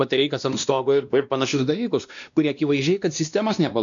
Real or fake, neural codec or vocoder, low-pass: fake; codec, 16 kHz, 1 kbps, X-Codec, WavLM features, trained on Multilingual LibriSpeech; 7.2 kHz